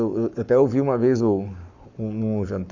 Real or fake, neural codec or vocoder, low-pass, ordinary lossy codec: fake; codec, 44.1 kHz, 7.8 kbps, Pupu-Codec; 7.2 kHz; none